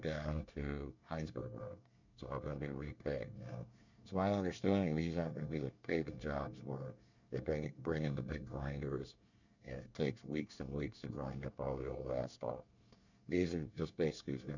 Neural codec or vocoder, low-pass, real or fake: codec, 24 kHz, 1 kbps, SNAC; 7.2 kHz; fake